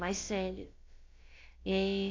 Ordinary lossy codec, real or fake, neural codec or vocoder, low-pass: AAC, 32 kbps; fake; codec, 16 kHz, about 1 kbps, DyCAST, with the encoder's durations; 7.2 kHz